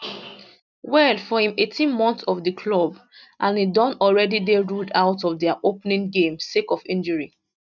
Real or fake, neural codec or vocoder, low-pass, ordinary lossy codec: real; none; 7.2 kHz; none